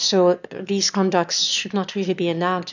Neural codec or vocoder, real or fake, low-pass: autoencoder, 22.05 kHz, a latent of 192 numbers a frame, VITS, trained on one speaker; fake; 7.2 kHz